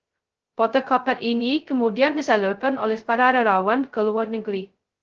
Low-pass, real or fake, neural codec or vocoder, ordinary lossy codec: 7.2 kHz; fake; codec, 16 kHz, 0.2 kbps, FocalCodec; Opus, 16 kbps